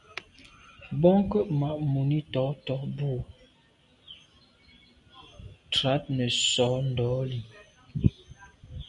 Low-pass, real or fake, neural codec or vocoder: 10.8 kHz; real; none